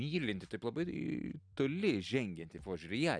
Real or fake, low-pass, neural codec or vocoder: real; 9.9 kHz; none